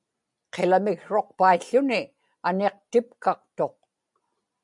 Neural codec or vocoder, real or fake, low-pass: none; real; 10.8 kHz